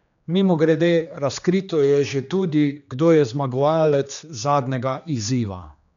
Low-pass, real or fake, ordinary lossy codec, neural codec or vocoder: 7.2 kHz; fake; none; codec, 16 kHz, 2 kbps, X-Codec, HuBERT features, trained on general audio